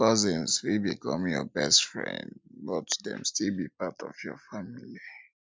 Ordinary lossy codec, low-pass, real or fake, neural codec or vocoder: none; none; real; none